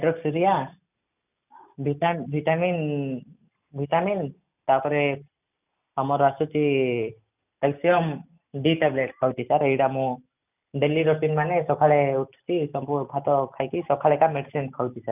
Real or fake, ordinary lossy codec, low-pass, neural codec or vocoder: real; none; 3.6 kHz; none